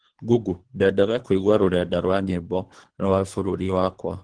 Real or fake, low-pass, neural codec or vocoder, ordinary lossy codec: fake; 9.9 kHz; codec, 24 kHz, 3 kbps, HILCodec; Opus, 16 kbps